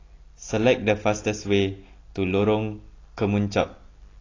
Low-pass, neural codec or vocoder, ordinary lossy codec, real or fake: 7.2 kHz; none; AAC, 32 kbps; real